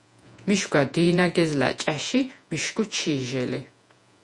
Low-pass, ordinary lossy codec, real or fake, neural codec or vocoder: 10.8 kHz; Opus, 64 kbps; fake; vocoder, 48 kHz, 128 mel bands, Vocos